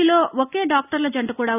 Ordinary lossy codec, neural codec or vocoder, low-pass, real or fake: none; none; 3.6 kHz; real